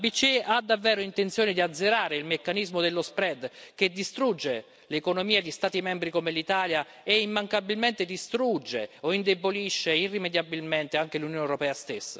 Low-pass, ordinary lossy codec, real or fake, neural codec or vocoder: none; none; real; none